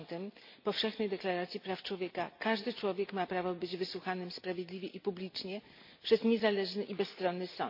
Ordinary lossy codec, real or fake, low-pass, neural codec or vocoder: MP3, 32 kbps; real; 5.4 kHz; none